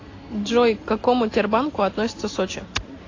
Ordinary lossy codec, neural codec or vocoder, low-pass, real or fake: AAC, 32 kbps; none; 7.2 kHz; real